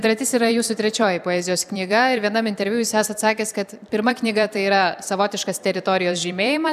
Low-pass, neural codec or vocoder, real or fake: 14.4 kHz; vocoder, 44.1 kHz, 128 mel bands every 256 samples, BigVGAN v2; fake